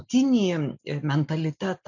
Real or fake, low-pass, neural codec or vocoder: real; 7.2 kHz; none